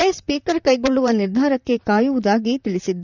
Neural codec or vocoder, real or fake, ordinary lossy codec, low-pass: vocoder, 44.1 kHz, 128 mel bands, Pupu-Vocoder; fake; none; 7.2 kHz